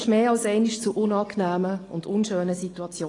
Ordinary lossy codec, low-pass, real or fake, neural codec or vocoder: AAC, 32 kbps; 10.8 kHz; real; none